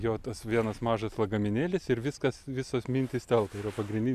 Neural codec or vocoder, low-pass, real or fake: none; 14.4 kHz; real